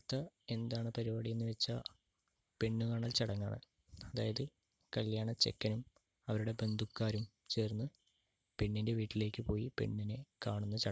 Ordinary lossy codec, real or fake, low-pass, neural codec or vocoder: none; real; none; none